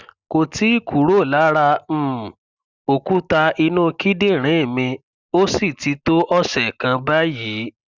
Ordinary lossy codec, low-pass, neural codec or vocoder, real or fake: Opus, 64 kbps; 7.2 kHz; none; real